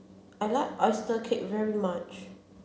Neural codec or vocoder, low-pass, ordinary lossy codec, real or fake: none; none; none; real